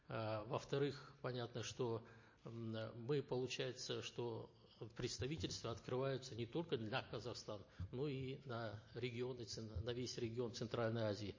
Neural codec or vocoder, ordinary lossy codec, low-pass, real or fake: none; MP3, 32 kbps; 7.2 kHz; real